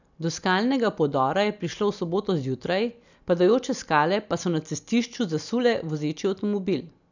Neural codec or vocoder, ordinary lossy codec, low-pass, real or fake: none; none; 7.2 kHz; real